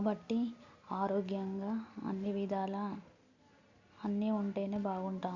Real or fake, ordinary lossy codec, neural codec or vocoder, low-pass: real; Opus, 64 kbps; none; 7.2 kHz